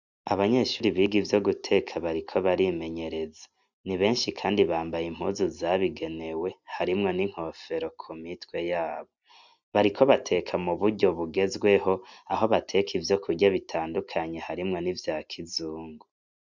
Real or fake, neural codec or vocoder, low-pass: real; none; 7.2 kHz